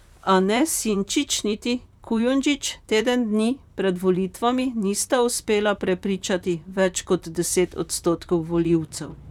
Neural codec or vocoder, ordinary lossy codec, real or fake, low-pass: vocoder, 44.1 kHz, 128 mel bands, Pupu-Vocoder; none; fake; 19.8 kHz